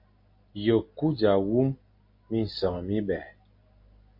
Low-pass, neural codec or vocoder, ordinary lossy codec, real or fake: 5.4 kHz; none; MP3, 32 kbps; real